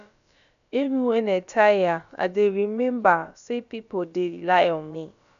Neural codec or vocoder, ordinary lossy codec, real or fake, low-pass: codec, 16 kHz, about 1 kbps, DyCAST, with the encoder's durations; none; fake; 7.2 kHz